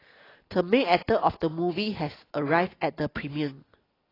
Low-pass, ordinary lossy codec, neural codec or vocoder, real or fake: 5.4 kHz; AAC, 24 kbps; none; real